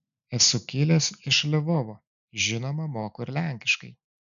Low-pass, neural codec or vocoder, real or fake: 7.2 kHz; none; real